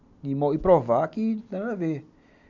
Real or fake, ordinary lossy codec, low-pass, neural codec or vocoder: real; none; 7.2 kHz; none